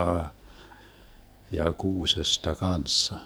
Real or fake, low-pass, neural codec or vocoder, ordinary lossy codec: fake; none; codec, 44.1 kHz, 2.6 kbps, SNAC; none